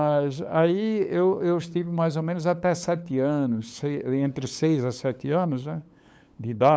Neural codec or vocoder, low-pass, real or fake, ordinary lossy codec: codec, 16 kHz, 8 kbps, FunCodec, trained on LibriTTS, 25 frames a second; none; fake; none